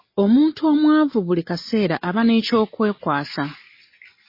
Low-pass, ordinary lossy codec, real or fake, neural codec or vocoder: 5.4 kHz; MP3, 24 kbps; real; none